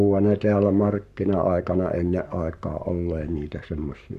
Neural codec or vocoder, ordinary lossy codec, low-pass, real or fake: codec, 44.1 kHz, 7.8 kbps, Pupu-Codec; none; 14.4 kHz; fake